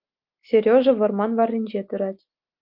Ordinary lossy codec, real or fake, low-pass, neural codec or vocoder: Opus, 24 kbps; real; 5.4 kHz; none